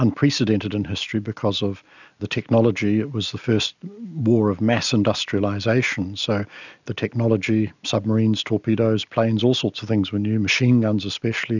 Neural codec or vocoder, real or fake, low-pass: none; real; 7.2 kHz